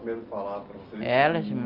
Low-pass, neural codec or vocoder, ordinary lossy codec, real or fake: 5.4 kHz; none; Opus, 32 kbps; real